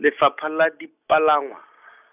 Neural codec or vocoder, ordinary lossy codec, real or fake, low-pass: none; none; real; 3.6 kHz